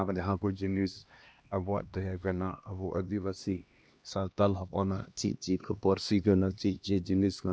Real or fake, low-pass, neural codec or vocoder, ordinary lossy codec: fake; none; codec, 16 kHz, 1 kbps, X-Codec, HuBERT features, trained on LibriSpeech; none